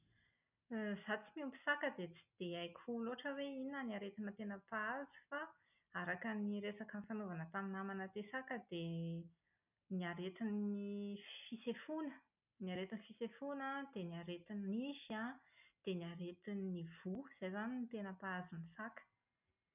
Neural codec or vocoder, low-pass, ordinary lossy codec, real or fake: none; 3.6 kHz; none; real